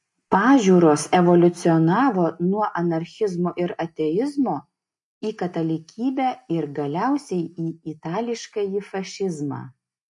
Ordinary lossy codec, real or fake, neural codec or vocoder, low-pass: MP3, 48 kbps; real; none; 10.8 kHz